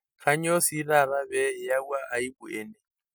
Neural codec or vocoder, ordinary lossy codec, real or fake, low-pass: none; none; real; none